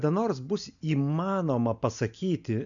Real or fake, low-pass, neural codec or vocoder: real; 7.2 kHz; none